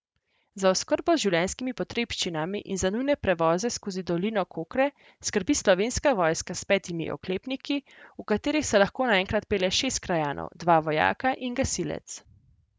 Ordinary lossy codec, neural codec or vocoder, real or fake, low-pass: none; none; real; none